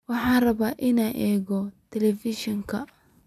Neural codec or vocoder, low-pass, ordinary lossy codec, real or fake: vocoder, 44.1 kHz, 128 mel bands every 256 samples, BigVGAN v2; 14.4 kHz; none; fake